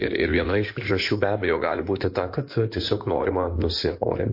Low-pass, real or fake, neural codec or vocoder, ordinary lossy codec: 5.4 kHz; fake; codec, 16 kHz in and 24 kHz out, 2.2 kbps, FireRedTTS-2 codec; MP3, 32 kbps